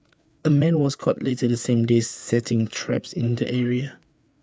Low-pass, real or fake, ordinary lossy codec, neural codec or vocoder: none; fake; none; codec, 16 kHz, 4 kbps, FreqCodec, larger model